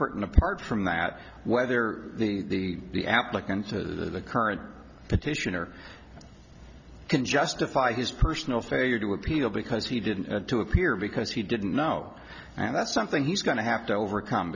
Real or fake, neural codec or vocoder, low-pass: real; none; 7.2 kHz